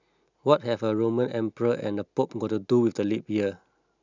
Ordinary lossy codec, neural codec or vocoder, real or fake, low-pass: none; none; real; 7.2 kHz